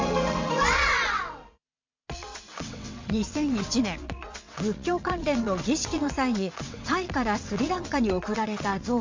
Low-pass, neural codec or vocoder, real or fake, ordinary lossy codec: 7.2 kHz; vocoder, 22.05 kHz, 80 mel bands, WaveNeXt; fake; MP3, 48 kbps